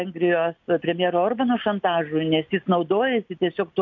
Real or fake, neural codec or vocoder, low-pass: real; none; 7.2 kHz